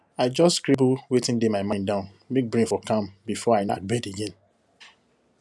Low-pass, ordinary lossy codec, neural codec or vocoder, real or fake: none; none; none; real